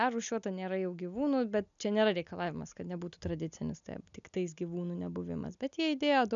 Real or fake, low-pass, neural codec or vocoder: real; 7.2 kHz; none